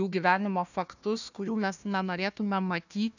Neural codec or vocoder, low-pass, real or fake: codec, 16 kHz, 1 kbps, FunCodec, trained on Chinese and English, 50 frames a second; 7.2 kHz; fake